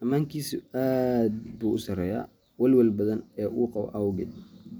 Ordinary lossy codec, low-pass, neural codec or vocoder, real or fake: none; none; none; real